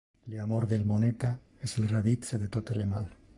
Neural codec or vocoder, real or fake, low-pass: codec, 44.1 kHz, 3.4 kbps, Pupu-Codec; fake; 10.8 kHz